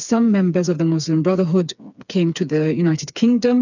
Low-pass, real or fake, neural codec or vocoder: 7.2 kHz; fake; codec, 16 kHz, 4 kbps, FreqCodec, smaller model